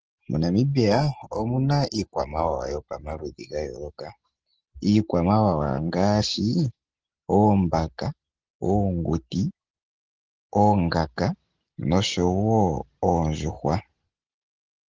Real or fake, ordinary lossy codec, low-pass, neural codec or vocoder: real; Opus, 16 kbps; 7.2 kHz; none